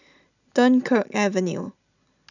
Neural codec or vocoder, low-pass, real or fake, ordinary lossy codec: none; 7.2 kHz; real; none